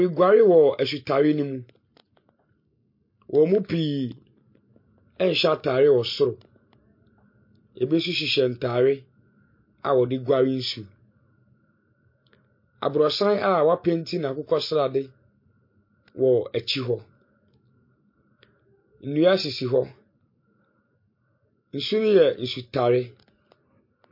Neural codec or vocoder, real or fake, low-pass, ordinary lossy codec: none; real; 5.4 kHz; MP3, 32 kbps